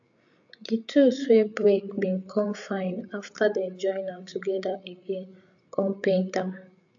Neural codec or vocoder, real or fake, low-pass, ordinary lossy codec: codec, 16 kHz, 8 kbps, FreqCodec, larger model; fake; 7.2 kHz; AAC, 64 kbps